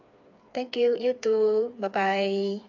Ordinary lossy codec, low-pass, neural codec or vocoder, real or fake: AAC, 48 kbps; 7.2 kHz; codec, 16 kHz, 4 kbps, FreqCodec, smaller model; fake